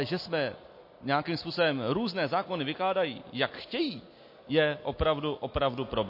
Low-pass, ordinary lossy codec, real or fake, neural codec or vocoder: 5.4 kHz; MP3, 32 kbps; real; none